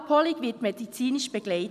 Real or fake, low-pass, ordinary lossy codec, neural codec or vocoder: real; 14.4 kHz; none; none